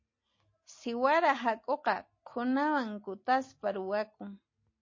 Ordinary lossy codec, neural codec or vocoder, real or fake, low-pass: MP3, 32 kbps; none; real; 7.2 kHz